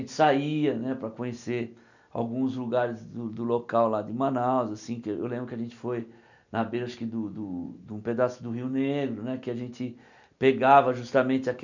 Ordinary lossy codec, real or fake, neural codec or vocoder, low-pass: none; real; none; 7.2 kHz